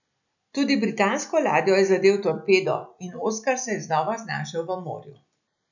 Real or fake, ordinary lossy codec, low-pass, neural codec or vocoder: real; none; 7.2 kHz; none